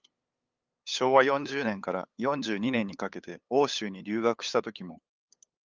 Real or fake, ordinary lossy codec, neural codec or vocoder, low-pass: fake; Opus, 24 kbps; codec, 16 kHz, 8 kbps, FunCodec, trained on LibriTTS, 25 frames a second; 7.2 kHz